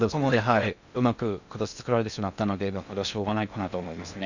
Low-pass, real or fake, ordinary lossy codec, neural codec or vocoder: 7.2 kHz; fake; none; codec, 16 kHz in and 24 kHz out, 0.6 kbps, FocalCodec, streaming, 2048 codes